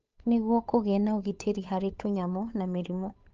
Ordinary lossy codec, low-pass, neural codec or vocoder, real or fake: Opus, 24 kbps; 7.2 kHz; codec, 16 kHz, 8 kbps, FunCodec, trained on Chinese and English, 25 frames a second; fake